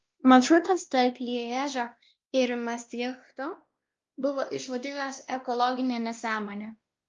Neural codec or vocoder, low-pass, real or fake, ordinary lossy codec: codec, 16 kHz, 1 kbps, X-Codec, WavLM features, trained on Multilingual LibriSpeech; 7.2 kHz; fake; Opus, 32 kbps